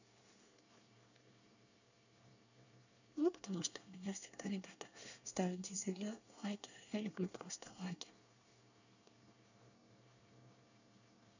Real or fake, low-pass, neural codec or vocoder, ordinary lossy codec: fake; 7.2 kHz; codec, 24 kHz, 1 kbps, SNAC; none